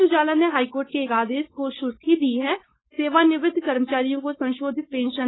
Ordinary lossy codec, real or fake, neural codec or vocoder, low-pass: AAC, 16 kbps; fake; codec, 16 kHz, 4.8 kbps, FACodec; 7.2 kHz